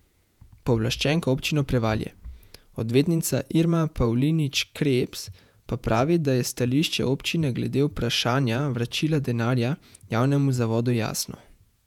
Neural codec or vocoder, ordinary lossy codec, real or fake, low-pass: vocoder, 48 kHz, 128 mel bands, Vocos; none; fake; 19.8 kHz